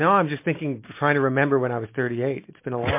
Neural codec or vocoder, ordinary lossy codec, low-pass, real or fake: none; MP3, 24 kbps; 3.6 kHz; real